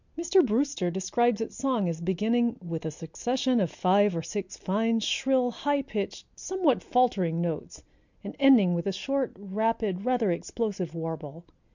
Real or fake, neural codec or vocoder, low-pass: real; none; 7.2 kHz